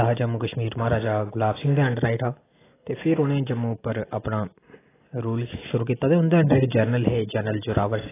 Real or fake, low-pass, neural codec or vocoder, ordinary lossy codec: real; 3.6 kHz; none; AAC, 16 kbps